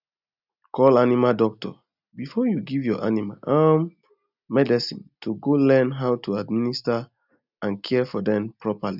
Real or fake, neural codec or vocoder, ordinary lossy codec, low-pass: real; none; none; 5.4 kHz